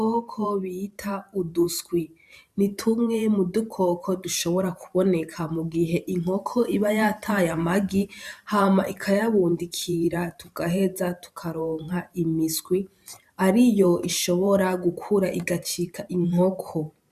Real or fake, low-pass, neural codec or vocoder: fake; 14.4 kHz; vocoder, 44.1 kHz, 128 mel bands every 512 samples, BigVGAN v2